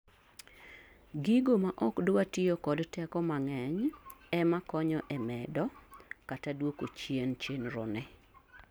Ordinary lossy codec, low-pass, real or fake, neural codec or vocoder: none; none; real; none